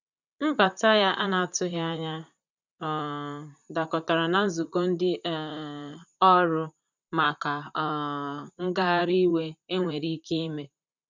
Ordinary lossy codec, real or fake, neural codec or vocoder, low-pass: none; fake; vocoder, 44.1 kHz, 128 mel bands, Pupu-Vocoder; 7.2 kHz